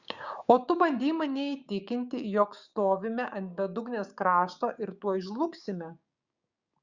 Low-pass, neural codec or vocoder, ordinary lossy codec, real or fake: 7.2 kHz; codec, 16 kHz, 6 kbps, DAC; Opus, 64 kbps; fake